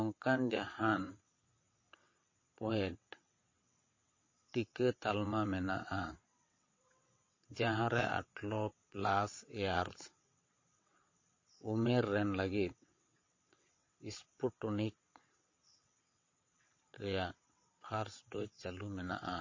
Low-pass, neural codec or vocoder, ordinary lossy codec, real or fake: 7.2 kHz; vocoder, 22.05 kHz, 80 mel bands, WaveNeXt; MP3, 32 kbps; fake